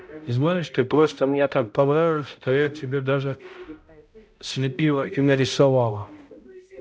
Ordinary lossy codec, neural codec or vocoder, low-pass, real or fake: none; codec, 16 kHz, 0.5 kbps, X-Codec, HuBERT features, trained on balanced general audio; none; fake